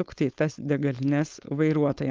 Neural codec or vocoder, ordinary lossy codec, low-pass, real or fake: codec, 16 kHz, 4.8 kbps, FACodec; Opus, 32 kbps; 7.2 kHz; fake